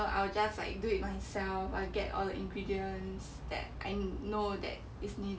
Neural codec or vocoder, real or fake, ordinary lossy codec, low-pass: none; real; none; none